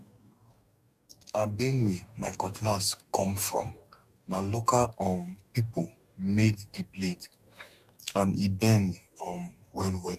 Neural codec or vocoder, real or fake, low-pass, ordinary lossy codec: codec, 44.1 kHz, 2.6 kbps, DAC; fake; 14.4 kHz; MP3, 96 kbps